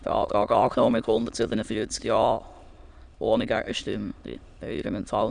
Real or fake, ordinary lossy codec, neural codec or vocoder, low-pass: fake; none; autoencoder, 22.05 kHz, a latent of 192 numbers a frame, VITS, trained on many speakers; 9.9 kHz